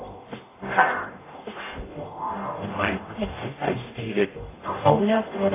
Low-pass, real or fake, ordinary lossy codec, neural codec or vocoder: 3.6 kHz; fake; AAC, 16 kbps; codec, 44.1 kHz, 0.9 kbps, DAC